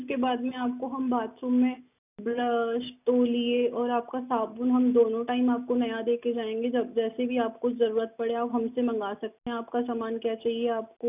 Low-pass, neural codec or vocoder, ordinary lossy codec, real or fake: 3.6 kHz; none; none; real